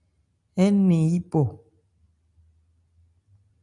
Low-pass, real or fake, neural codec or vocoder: 10.8 kHz; real; none